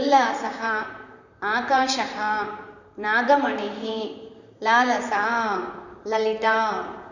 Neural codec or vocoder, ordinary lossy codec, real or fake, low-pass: vocoder, 44.1 kHz, 128 mel bands, Pupu-Vocoder; none; fake; 7.2 kHz